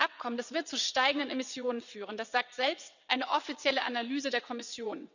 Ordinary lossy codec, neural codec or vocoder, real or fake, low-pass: none; vocoder, 22.05 kHz, 80 mel bands, WaveNeXt; fake; 7.2 kHz